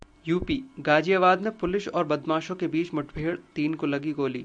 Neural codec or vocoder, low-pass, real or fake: none; 9.9 kHz; real